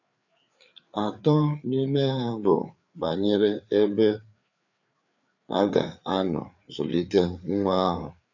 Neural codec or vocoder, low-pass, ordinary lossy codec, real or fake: codec, 16 kHz, 4 kbps, FreqCodec, larger model; 7.2 kHz; none; fake